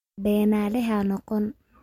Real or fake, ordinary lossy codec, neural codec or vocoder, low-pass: real; MP3, 64 kbps; none; 19.8 kHz